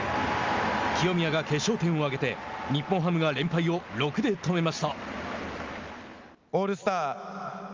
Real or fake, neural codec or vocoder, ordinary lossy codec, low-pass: real; none; Opus, 32 kbps; 7.2 kHz